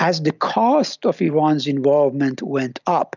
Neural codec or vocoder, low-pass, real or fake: none; 7.2 kHz; real